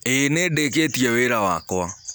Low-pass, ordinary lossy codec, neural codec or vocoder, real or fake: none; none; none; real